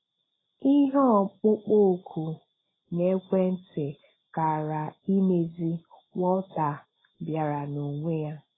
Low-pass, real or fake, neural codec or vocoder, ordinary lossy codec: 7.2 kHz; real; none; AAC, 16 kbps